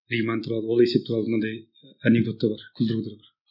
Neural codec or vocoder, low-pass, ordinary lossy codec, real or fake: none; 5.4 kHz; none; real